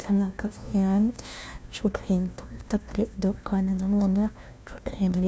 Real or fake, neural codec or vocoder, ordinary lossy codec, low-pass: fake; codec, 16 kHz, 1 kbps, FunCodec, trained on LibriTTS, 50 frames a second; none; none